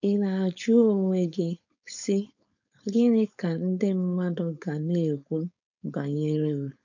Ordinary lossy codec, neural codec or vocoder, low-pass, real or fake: none; codec, 16 kHz, 4.8 kbps, FACodec; 7.2 kHz; fake